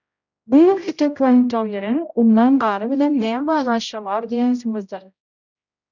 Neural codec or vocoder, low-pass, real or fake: codec, 16 kHz, 0.5 kbps, X-Codec, HuBERT features, trained on general audio; 7.2 kHz; fake